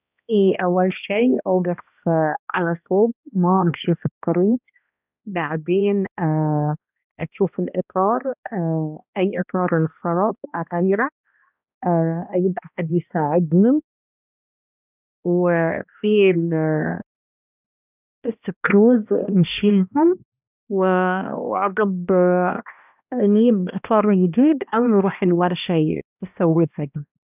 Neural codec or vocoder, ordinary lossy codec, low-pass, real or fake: codec, 16 kHz, 1 kbps, X-Codec, HuBERT features, trained on balanced general audio; none; 3.6 kHz; fake